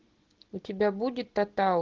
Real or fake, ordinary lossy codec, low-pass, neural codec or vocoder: real; Opus, 16 kbps; 7.2 kHz; none